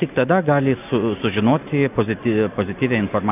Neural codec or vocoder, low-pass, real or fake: none; 3.6 kHz; real